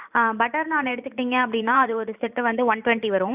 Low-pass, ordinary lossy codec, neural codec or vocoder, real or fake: 3.6 kHz; none; vocoder, 44.1 kHz, 128 mel bands every 512 samples, BigVGAN v2; fake